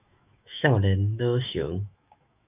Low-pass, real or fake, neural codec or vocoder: 3.6 kHz; fake; autoencoder, 48 kHz, 128 numbers a frame, DAC-VAE, trained on Japanese speech